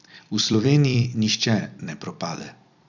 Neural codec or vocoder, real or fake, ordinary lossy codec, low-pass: vocoder, 44.1 kHz, 128 mel bands every 256 samples, BigVGAN v2; fake; none; 7.2 kHz